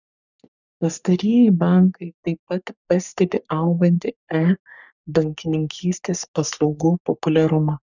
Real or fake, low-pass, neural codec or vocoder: fake; 7.2 kHz; codec, 44.1 kHz, 3.4 kbps, Pupu-Codec